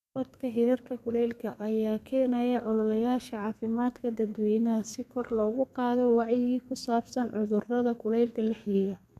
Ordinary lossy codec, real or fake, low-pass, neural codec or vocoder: none; fake; 14.4 kHz; codec, 32 kHz, 1.9 kbps, SNAC